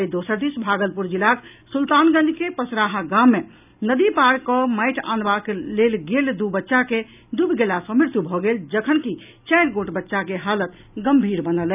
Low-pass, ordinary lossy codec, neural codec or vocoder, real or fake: 3.6 kHz; none; none; real